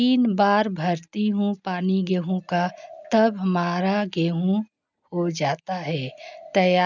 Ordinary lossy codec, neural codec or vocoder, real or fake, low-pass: none; none; real; 7.2 kHz